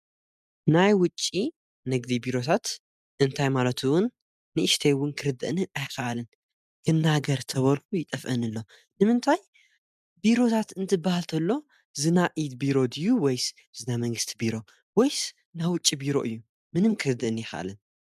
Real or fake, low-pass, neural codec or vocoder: real; 14.4 kHz; none